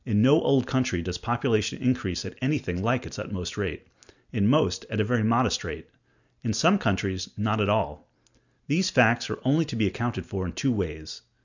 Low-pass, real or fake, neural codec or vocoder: 7.2 kHz; real; none